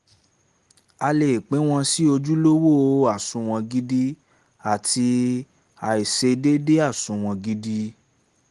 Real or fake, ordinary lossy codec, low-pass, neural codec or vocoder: real; Opus, 24 kbps; 10.8 kHz; none